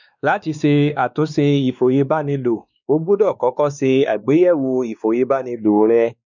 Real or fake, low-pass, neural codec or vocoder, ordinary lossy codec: fake; 7.2 kHz; codec, 16 kHz, 2 kbps, X-Codec, WavLM features, trained on Multilingual LibriSpeech; none